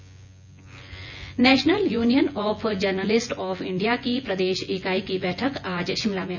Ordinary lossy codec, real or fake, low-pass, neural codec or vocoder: none; fake; 7.2 kHz; vocoder, 24 kHz, 100 mel bands, Vocos